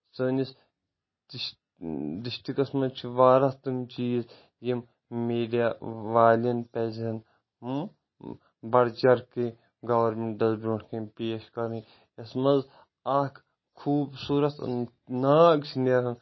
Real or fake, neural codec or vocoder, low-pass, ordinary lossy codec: real; none; 7.2 kHz; MP3, 24 kbps